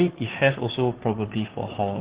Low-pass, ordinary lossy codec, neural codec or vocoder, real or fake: 3.6 kHz; Opus, 16 kbps; codec, 16 kHz, 2 kbps, FunCodec, trained on Chinese and English, 25 frames a second; fake